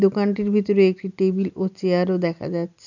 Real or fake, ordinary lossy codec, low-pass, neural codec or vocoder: real; none; 7.2 kHz; none